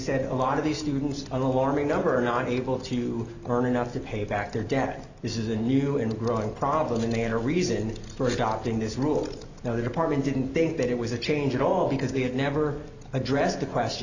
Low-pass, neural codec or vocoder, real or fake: 7.2 kHz; none; real